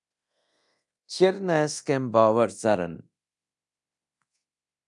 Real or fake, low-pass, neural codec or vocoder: fake; 10.8 kHz; codec, 24 kHz, 0.9 kbps, DualCodec